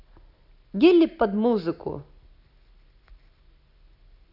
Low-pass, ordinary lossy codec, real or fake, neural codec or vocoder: 5.4 kHz; none; real; none